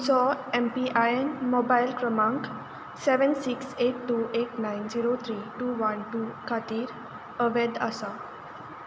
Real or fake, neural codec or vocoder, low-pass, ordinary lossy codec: real; none; none; none